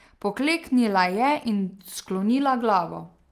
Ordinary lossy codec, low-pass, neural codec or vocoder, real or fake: Opus, 24 kbps; 14.4 kHz; none; real